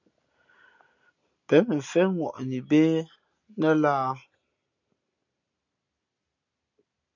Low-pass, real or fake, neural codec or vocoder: 7.2 kHz; real; none